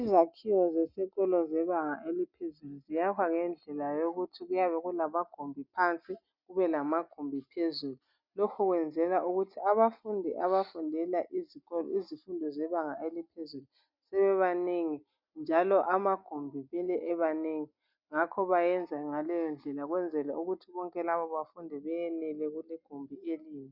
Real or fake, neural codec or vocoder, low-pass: real; none; 5.4 kHz